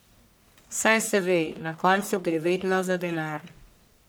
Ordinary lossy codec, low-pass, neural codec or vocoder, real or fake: none; none; codec, 44.1 kHz, 1.7 kbps, Pupu-Codec; fake